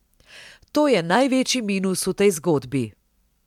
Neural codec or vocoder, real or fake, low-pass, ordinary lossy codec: none; real; 19.8 kHz; MP3, 96 kbps